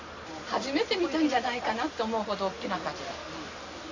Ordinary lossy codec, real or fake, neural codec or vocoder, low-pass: Opus, 64 kbps; real; none; 7.2 kHz